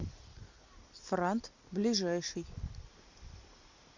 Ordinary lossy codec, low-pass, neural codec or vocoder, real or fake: MP3, 64 kbps; 7.2 kHz; none; real